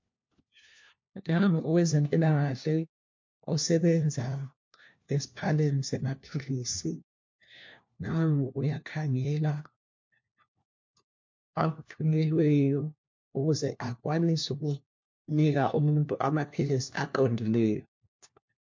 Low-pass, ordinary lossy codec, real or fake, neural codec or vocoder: 7.2 kHz; MP3, 48 kbps; fake; codec, 16 kHz, 1 kbps, FunCodec, trained on LibriTTS, 50 frames a second